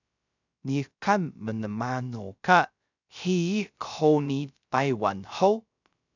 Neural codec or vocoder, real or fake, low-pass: codec, 16 kHz, 0.3 kbps, FocalCodec; fake; 7.2 kHz